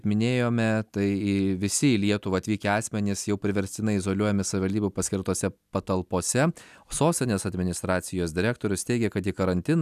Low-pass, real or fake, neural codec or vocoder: 14.4 kHz; real; none